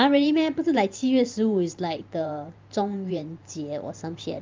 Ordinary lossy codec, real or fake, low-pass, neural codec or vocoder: Opus, 32 kbps; fake; 7.2 kHz; vocoder, 44.1 kHz, 128 mel bands every 512 samples, BigVGAN v2